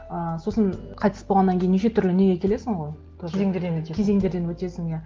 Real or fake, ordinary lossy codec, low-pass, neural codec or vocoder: real; Opus, 16 kbps; 7.2 kHz; none